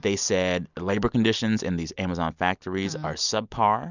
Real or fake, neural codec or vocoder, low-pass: real; none; 7.2 kHz